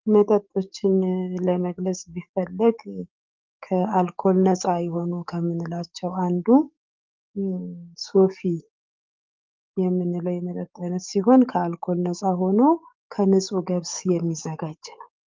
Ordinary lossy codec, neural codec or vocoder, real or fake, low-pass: Opus, 24 kbps; codec, 44.1 kHz, 7.8 kbps, DAC; fake; 7.2 kHz